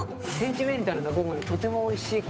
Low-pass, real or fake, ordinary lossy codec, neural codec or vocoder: none; fake; none; codec, 16 kHz, 8 kbps, FunCodec, trained on Chinese and English, 25 frames a second